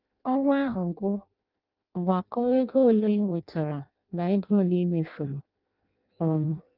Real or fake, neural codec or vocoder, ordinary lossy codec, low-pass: fake; codec, 16 kHz in and 24 kHz out, 0.6 kbps, FireRedTTS-2 codec; Opus, 24 kbps; 5.4 kHz